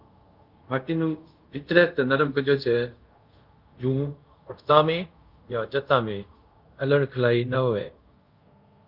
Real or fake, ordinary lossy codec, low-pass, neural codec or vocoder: fake; Opus, 24 kbps; 5.4 kHz; codec, 24 kHz, 0.5 kbps, DualCodec